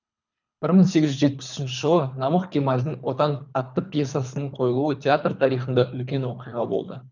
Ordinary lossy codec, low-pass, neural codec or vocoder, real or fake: none; 7.2 kHz; codec, 24 kHz, 3 kbps, HILCodec; fake